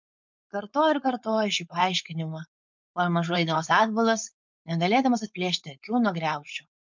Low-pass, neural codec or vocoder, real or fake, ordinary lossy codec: 7.2 kHz; codec, 16 kHz, 4.8 kbps, FACodec; fake; MP3, 64 kbps